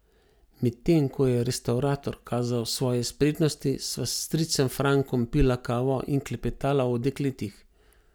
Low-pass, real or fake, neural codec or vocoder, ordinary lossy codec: none; real; none; none